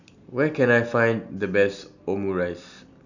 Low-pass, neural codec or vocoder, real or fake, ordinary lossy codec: 7.2 kHz; none; real; none